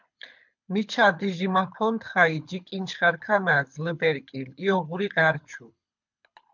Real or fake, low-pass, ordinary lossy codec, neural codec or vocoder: fake; 7.2 kHz; MP3, 64 kbps; codec, 24 kHz, 6 kbps, HILCodec